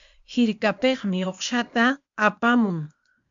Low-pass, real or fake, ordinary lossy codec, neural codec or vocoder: 7.2 kHz; fake; MP3, 64 kbps; codec, 16 kHz, 0.8 kbps, ZipCodec